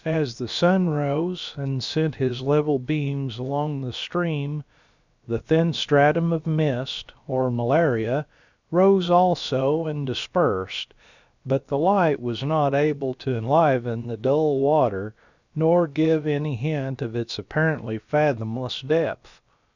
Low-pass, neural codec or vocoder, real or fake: 7.2 kHz; codec, 16 kHz, about 1 kbps, DyCAST, with the encoder's durations; fake